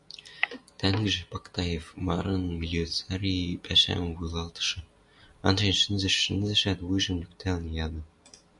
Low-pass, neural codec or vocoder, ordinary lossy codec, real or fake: 10.8 kHz; none; MP3, 96 kbps; real